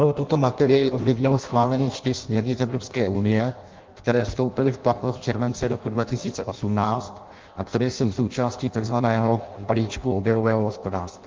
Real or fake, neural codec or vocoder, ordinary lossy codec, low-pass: fake; codec, 16 kHz in and 24 kHz out, 0.6 kbps, FireRedTTS-2 codec; Opus, 32 kbps; 7.2 kHz